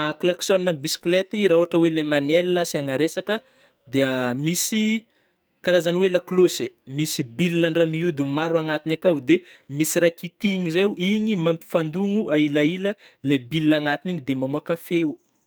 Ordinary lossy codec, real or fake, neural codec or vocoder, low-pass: none; fake; codec, 44.1 kHz, 2.6 kbps, SNAC; none